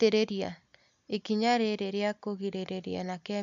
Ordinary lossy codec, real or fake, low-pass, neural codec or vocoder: none; real; 7.2 kHz; none